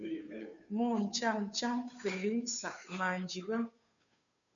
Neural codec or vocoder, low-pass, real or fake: codec, 16 kHz, 2 kbps, FunCodec, trained on Chinese and English, 25 frames a second; 7.2 kHz; fake